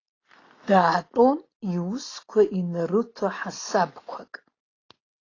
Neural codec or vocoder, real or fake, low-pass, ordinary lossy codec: none; real; 7.2 kHz; AAC, 32 kbps